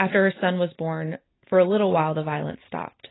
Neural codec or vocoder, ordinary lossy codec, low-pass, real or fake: none; AAC, 16 kbps; 7.2 kHz; real